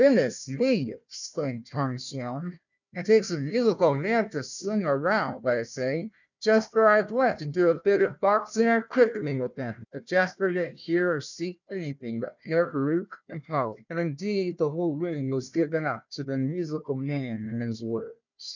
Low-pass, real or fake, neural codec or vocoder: 7.2 kHz; fake; codec, 16 kHz, 1 kbps, FunCodec, trained on Chinese and English, 50 frames a second